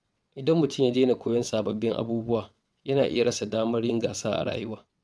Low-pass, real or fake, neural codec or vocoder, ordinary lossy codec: none; fake; vocoder, 22.05 kHz, 80 mel bands, WaveNeXt; none